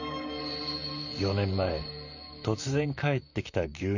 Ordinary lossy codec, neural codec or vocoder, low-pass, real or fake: none; vocoder, 44.1 kHz, 128 mel bands, Pupu-Vocoder; 7.2 kHz; fake